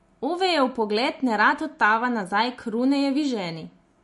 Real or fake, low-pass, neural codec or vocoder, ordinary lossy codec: real; 14.4 kHz; none; MP3, 48 kbps